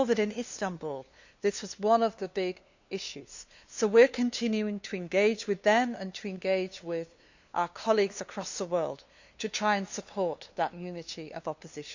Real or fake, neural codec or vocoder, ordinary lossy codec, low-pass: fake; codec, 16 kHz, 2 kbps, FunCodec, trained on LibriTTS, 25 frames a second; Opus, 64 kbps; 7.2 kHz